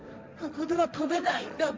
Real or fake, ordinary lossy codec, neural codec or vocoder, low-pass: fake; none; codec, 16 kHz, 1.1 kbps, Voila-Tokenizer; none